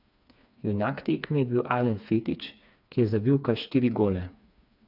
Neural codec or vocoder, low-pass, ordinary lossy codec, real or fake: codec, 16 kHz, 4 kbps, FreqCodec, smaller model; 5.4 kHz; none; fake